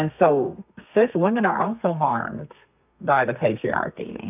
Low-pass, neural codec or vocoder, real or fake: 3.6 kHz; codec, 32 kHz, 1.9 kbps, SNAC; fake